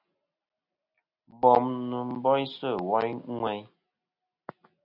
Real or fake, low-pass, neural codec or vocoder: real; 5.4 kHz; none